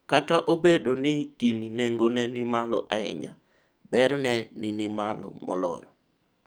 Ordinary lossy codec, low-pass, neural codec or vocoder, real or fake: none; none; codec, 44.1 kHz, 2.6 kbps, SNAC; fake